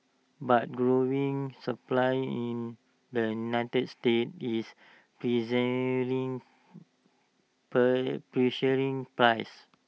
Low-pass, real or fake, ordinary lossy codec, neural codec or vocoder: none; real; none; none